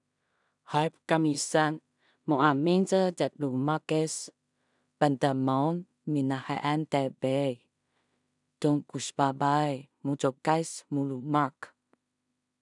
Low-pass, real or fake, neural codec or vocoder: 10.8 kHz; fake; codec, 16 kHz in and 24 kHz out, 0.4 kbps, LongCat-Audio-Codec, two codebook decoder